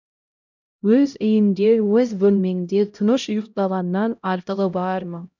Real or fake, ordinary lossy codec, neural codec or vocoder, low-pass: fake; none; codec, 16 kHz, 0.5 kbps, X-Codec, HuBERT features, trained on LibriSpeech; 7.2 kHz